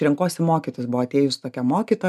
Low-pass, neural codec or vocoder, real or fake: 14.4 kHz; none; real